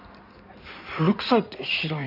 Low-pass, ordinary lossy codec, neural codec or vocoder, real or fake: 5.4 kHz; none; codec, 16 kHz in and 24 kHz out, 2.2 kbps, FireRedTTS-2 codec; fake